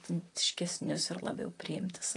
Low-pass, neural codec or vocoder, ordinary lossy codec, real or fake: 10.8 kHz; vocoder, 44.1 kHz, 128 mel bands, Pupu-Vocoder; AAC, 48 kbps; fake